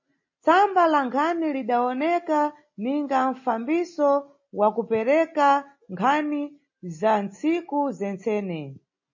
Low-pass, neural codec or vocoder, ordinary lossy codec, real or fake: 7.2 kHz; none; MP3, 32 kbps; real